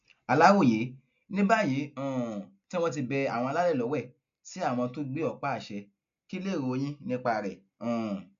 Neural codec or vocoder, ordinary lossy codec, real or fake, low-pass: none; MP3, 64 kbps; real; 7.2 kHz